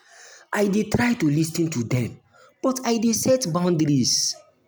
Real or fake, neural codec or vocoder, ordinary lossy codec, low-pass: real; none; none; none